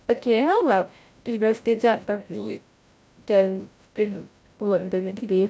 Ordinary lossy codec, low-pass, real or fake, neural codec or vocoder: none; none; fake; codec, 16 kHz, 0.5 kbps, FreqCodec, larger model